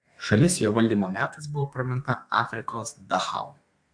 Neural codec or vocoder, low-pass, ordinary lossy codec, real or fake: codec, 32 kHz, 1.9 kbps, SNAC; 9.9 kHz; AAC, 64 kbps; fake